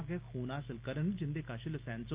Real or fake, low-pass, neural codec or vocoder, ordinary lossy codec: real; 3.6 kHz; none; Opus, 32 kbps